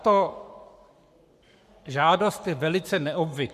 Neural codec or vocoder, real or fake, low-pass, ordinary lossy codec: codec, 44.1 kHz, 7.8 kbps, Pupu-Codec; fake; 14.4 kHz; MP3, 96 kbps